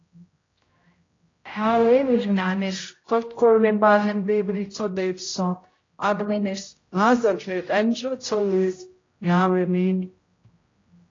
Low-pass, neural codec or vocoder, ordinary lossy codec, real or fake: 7.2 kHz; codec, 16 kHz, 0.5 kbps, X-Codec, HuBERT features, trained on general audio; AAC, 32 kbps; fake